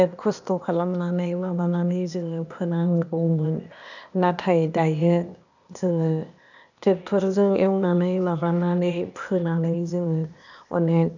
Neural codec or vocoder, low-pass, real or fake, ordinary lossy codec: codec, 16 kHz, 0.8 kbps, ZipCodec; 7.2 kHz; fake; none